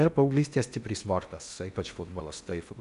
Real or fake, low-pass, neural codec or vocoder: fake; 10.8 kHz; codec, 16 kHz in and 24 kHz out, 0.8 kbps, FocalCodec, streaming, 65536 codes